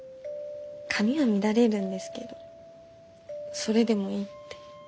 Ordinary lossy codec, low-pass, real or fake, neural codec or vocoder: none; none; real; none